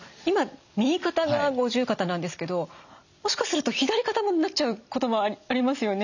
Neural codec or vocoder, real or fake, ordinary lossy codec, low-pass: none; real; none; 7.2 kHz